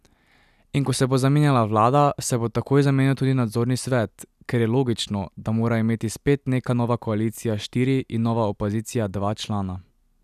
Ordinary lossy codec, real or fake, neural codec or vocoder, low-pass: none; real; none; 14.4 kHz